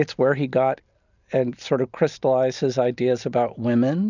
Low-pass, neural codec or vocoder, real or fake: 7.2 kHz; none; real